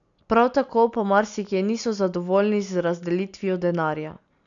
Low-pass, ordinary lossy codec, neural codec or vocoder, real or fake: 7.2 kHz; MP3, 96 kbps; none; real